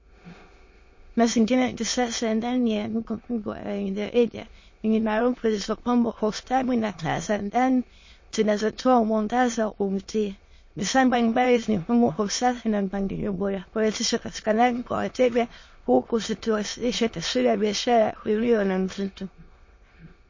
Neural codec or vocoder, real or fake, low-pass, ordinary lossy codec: autoencoder, 22.05 kHz, a latent of 192 numbers a frame, VITS, trained on many speakers; fake; 7.2 kHz; MP3, 32 kbps